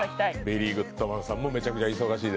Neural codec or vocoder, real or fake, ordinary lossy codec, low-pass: none; real; none; none